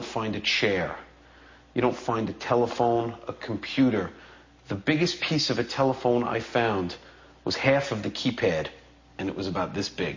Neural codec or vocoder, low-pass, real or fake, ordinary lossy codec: none; 7.2 kHz; real; MP3, 32 kbps